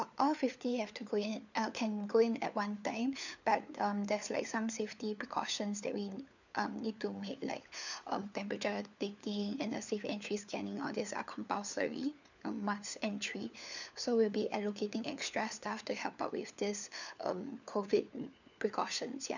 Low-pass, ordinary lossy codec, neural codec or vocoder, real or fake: 7.2 kHz; none; codec, 16 kHz, 4 kbps, FunCodec, trained on LibriTTS, 50 frames a second; fake